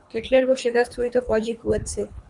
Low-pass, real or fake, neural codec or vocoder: 10.8 kHz; fake; codec, 24 kHz, 3 kbps, HILCodec